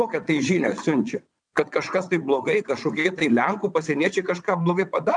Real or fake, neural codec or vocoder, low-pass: fake; vocoder, 22.05 kHz, 80 mel bands, WaveNeXt; 9.9 kHz